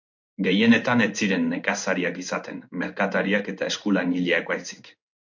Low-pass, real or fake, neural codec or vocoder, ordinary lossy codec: 7.2 kHz; fake; codec, 16 kHz in and 24 kHz out, 1 kbps, XY-Tokenizer; MP3, 48 kbps